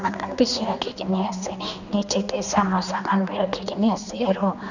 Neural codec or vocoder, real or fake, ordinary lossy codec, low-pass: codec, 16 kHz, 2 kbps, X-Codec, HuBERT features, trained on general audio; fake; none; 7.2 kHz